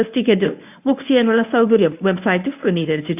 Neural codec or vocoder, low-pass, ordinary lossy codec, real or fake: codec, 24 kHz, 0.9 kbps, WavTokenizer, medium speech release version 1; 3.6 kHz; AAC, 32 kbps; fake